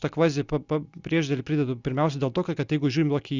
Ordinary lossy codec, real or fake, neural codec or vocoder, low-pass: Opus, 64 kbps; real; none; 7.2 kHz